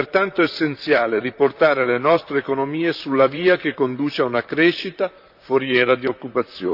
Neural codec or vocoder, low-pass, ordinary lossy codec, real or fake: vocoder, 44.1 kHz, 128 mel bands, Pupu-Vocoder; 5.4 kHz; none; fake